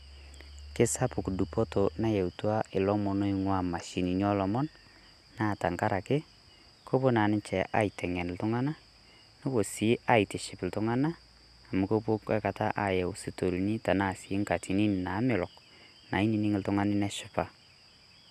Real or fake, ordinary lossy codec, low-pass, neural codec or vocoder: real; none; 14.4 kHz; none